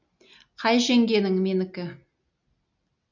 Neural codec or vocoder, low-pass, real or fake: none; 7.2 kHz; real